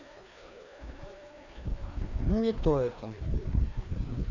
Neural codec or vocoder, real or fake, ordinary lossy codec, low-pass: codec, 16 kHz, 2 kbps, FreqCodec, larger model; fake; none; 7.2 kHz